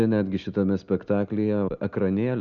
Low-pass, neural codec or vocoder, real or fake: 7.2 kHz; none; real